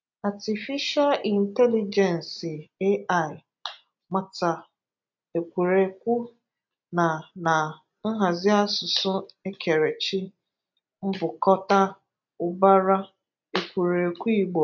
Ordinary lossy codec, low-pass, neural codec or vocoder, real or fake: MP3, 64 kbps; 7.2 kHz; none; real